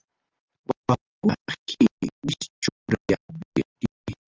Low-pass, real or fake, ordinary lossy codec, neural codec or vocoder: 7.2 kHz; real; Opus, 24 kbps; none